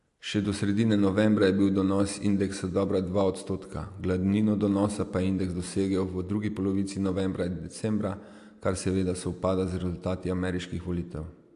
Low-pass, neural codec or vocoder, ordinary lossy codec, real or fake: 10.8 kHz; none; AAC, 64 kbps; real